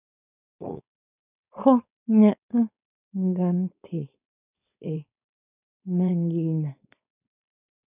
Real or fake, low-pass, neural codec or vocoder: fake; 3.6 kHz; codec, 16 kHz, 4.8 kbps, FACodec